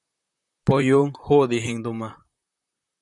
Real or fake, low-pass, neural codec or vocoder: fake; 10.8 kHz; vocoder, 44.1 kHz, 128 mel bands, Pupu-Vocoder